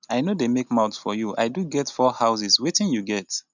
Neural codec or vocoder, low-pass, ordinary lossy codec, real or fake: none; 7.2 kHz; none; real